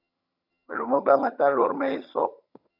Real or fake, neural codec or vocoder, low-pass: fake; vocoder, 22.05 kHz, 80 mel bands, HiFi-GAN; 5.4 kHz